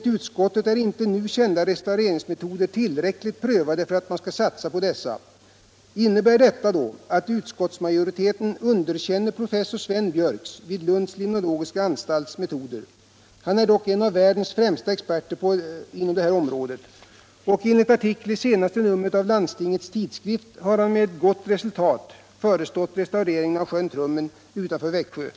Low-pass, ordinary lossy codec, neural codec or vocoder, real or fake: none; none; none; real